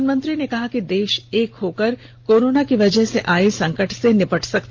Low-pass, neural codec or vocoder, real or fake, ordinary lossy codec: 7.2 kHz; none; real; Opus, 32 kbps